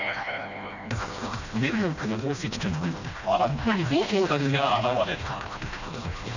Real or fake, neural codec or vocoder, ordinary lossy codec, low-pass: fake; codec, 16 kHz, 1 kbps, FreqCodec, smaller model; none; 7.2 kHz